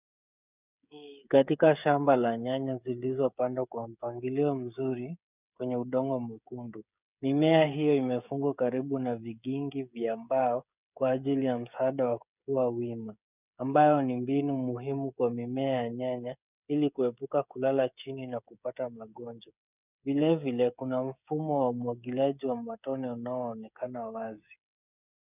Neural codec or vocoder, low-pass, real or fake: codec, 16 kHz, 8 kbps, FreqCodec, smaller model; 3.6 kHz; fake